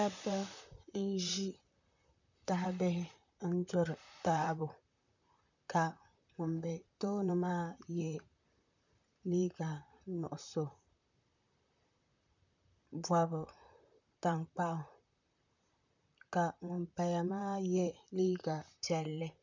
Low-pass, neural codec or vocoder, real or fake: 7.2 kHz; vocoder, 44.1 kHz, 128 mel bands, Pupu-Vocoder; fake